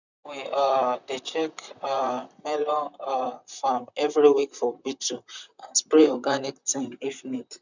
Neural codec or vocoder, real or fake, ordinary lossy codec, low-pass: vocoder, 44.1 kHz, 128 mel bands, Pupu-Vocoder; fake; none; 7.2 kHz